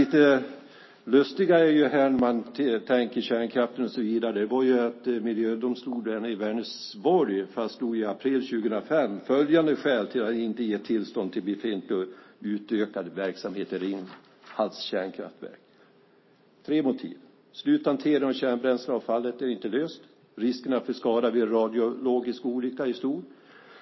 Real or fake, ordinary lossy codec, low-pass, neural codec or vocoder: real; MP3, 24 kbps; 7.2 kHz; none